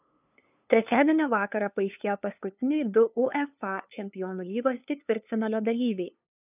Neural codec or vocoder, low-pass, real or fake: codec, 16 kHz, 2 kbps, FunCodec, trained on LibriTTS, 25 frames a second; 3.6 kHz; fake